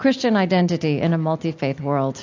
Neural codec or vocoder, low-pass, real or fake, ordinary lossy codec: none; 7.2 kHz; real; AAC, 48 kbps